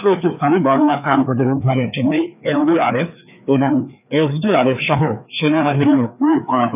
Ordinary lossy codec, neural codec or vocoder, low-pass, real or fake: none; codec, 16 kHz, 2 kbps, FreqCodec, larger model; 3.6 kHz; fake